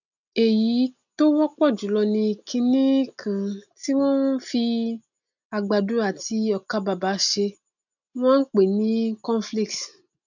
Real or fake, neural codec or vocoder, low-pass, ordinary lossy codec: real; none; 7.2 kHz; none